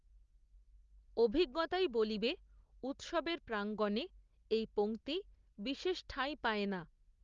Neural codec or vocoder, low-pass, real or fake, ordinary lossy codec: none; 7.2 kHz; real; Opus, 32 kbps